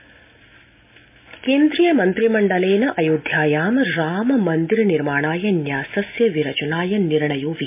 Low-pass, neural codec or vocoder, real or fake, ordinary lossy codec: 3.6 kHz; none; real; MP3, 32 kbps